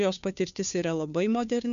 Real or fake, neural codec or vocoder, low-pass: fake; codec, 16 kHz, 2 kbps, FunCodec, trained on Chinese and English, 25 frames a second; 7.2 kHz